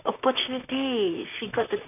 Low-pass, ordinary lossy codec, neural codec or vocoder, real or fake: 3.6 kHz; none; vocoder, 44.1 kHz, 128 mel bands, Pupu-Vocoder; fake